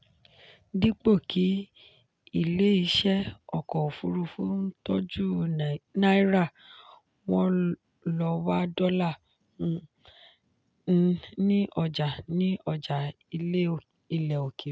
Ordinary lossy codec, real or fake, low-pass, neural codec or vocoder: none; real; none; none